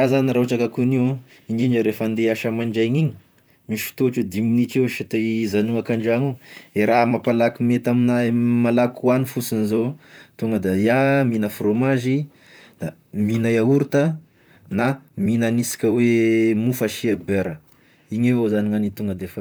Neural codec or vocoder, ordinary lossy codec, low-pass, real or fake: vocoder, 44.1 kHz, 128 mel bands, Pupu-Vocoder; none; none; fake